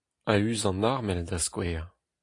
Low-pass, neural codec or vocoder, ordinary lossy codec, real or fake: 10.8 kHz; none; AAC, 48 kbps; real